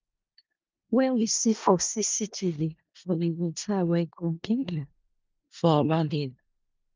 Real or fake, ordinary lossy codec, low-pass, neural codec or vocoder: fake; Opus, 24 kbps; 7.2 kHz; codec, 16 kHz in and 24 kHz out, 0.4 kbps, LongCat-Audio-Codec, four codebook decoder